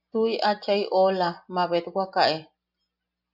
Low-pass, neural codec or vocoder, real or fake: 5.4 kHz; none; real